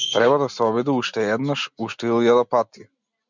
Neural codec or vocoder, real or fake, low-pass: none; real; 7.2 kHz